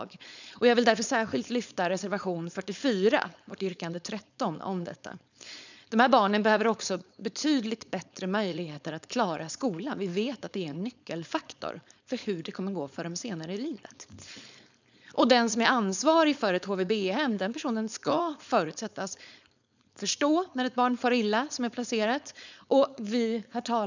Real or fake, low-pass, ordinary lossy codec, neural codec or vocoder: fake; 7.2 kHz; none; codec, 16 kHz, 4.8 kbps, FACodec